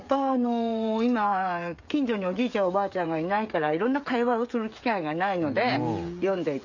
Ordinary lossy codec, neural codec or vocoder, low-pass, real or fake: none; codec, 16 kHz, 16 kbps, FreqCodec, smaller model; 7.2 kHz; fake